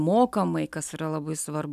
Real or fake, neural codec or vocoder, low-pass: real; none; 14.4 kHz